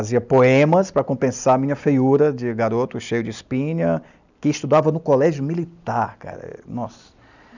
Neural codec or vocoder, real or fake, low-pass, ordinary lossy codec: none; real; 7.2 kHz; none